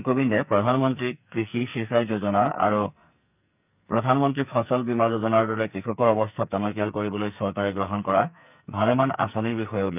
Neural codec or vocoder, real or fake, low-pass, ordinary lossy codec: codec, 44.1 kHz, 2.6 kbps, SNAC; fake; 3.6 kHz; none